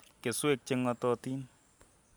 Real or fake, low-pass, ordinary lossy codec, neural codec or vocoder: real; none; none; none